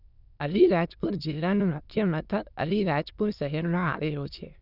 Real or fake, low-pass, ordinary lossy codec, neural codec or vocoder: fake; 5.4 kHz; none; autoencoder, 22.05 kHz, a latent of 192 numbers a frame, VITS, trained on many speakers